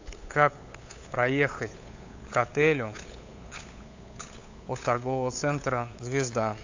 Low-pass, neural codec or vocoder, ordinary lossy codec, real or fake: 7.2 kHz; codec, 16 kHz, 8 kbps, FunCodec, trained on LibriTTS, 25 frames a second; AAC, 48 kbps; fake